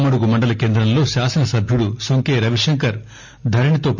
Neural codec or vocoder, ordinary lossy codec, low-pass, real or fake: none; none; 7.2 kHz; real